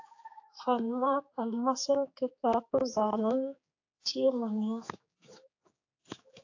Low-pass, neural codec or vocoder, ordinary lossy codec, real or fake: 7.2 kHz; codec, 16 kHz, 4 kbps, X-Codec, HuBERT features, trained on general audio; AAC, 48 kbps; fake